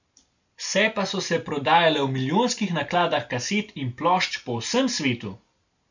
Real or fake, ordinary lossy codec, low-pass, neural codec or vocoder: real; none; 7.2 kHz; none